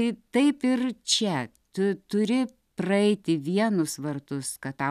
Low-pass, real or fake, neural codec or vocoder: 14.4 kHz; real; none